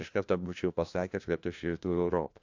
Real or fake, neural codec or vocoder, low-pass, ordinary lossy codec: fake; codec, 16 kHz, 1 kbps, FunCodec, trained on LibriTTS, 50 frames a second; 7.2 kHz; AAC, 48 kbps